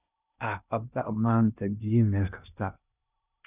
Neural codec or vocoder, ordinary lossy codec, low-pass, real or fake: codec, 16 kHz in and 24 kHz out, 0.6 kbps, FocalCodec, streaming, 2048 codes; none; 3.6 kHz; fake